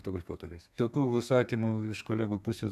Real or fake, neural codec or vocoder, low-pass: fake; codec, 32 kHz, 1.9 kbps, SNAC; 14.4 kHz